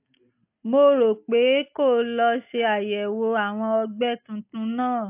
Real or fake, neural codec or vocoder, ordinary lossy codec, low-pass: real; none; AAC, 32 kbps; 3.6 kHz